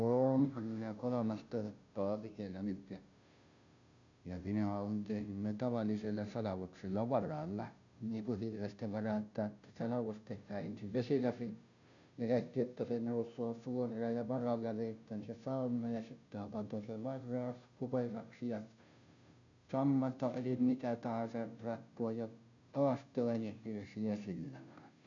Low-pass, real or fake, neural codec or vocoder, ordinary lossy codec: 7.2 kHz; fake; codec, 16 kHz, 0.5 kbps, FunCodec, trained on Chinese and English, 25 frames a second; none